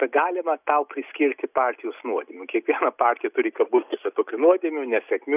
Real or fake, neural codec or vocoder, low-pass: real; none; 3.6 kHz